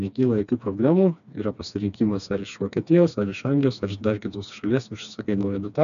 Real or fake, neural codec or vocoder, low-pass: fake; codec, 16 kHz, 2 kbps, FreqCodec, smaller model; 7.2 kHz